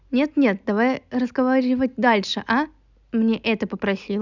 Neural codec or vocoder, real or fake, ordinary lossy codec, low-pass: none; real; none; 7.2 kHz